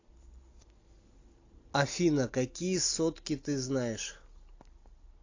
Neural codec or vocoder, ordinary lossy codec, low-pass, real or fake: none; AAC, 48 kbps; 7.2 kHz; real